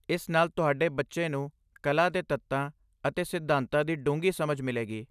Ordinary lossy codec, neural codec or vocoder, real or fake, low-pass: none; none; real; 14.4 kHz